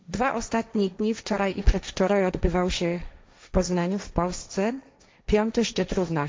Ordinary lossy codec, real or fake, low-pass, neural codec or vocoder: none; fake; none; codec, 16 kHz, 1.1 kbps, Voila-Tokenizer